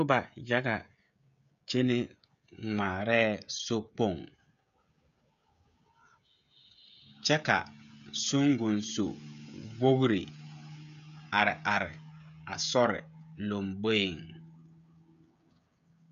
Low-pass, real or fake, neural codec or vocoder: 7.2 kHz; fake; codec, 16 kHz, 16 kbps, FreqCodec, smaller model